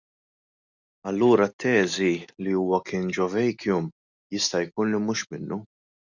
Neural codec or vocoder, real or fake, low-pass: none; real; 7.2 kHz